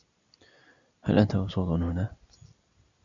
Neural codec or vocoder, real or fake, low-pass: none; real; 7.2 kHz